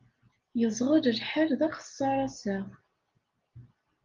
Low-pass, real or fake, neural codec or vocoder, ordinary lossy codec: 7.2 kHz; real; none; Opus, 32 kbps